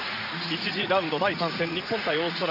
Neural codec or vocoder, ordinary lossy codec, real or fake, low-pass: vocoder, 44.1 kHz, 80 mel bands, Vocos; none; fake; 5.4 kHz